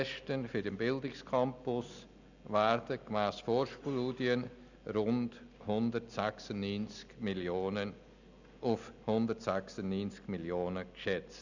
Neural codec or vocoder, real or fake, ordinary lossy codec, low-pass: none; real; none; 7.2 kHz